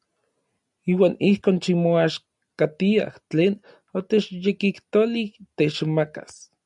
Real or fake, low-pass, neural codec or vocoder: real; 10.8 kHz; none